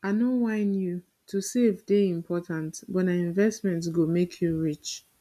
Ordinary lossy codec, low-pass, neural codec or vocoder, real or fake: none; 14.4 kHz; none; real